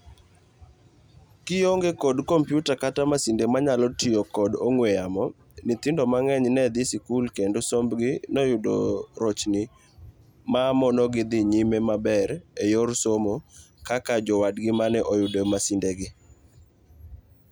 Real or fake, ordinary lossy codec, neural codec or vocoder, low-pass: real; none; none; none